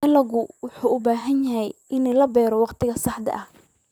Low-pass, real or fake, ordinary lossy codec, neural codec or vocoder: 19.8 kHz; fake; none; vocoder, 44.1 kHz, 128 mel bands, Pupu-Vocoder